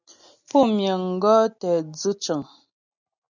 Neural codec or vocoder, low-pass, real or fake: none; 7.2 kHz; real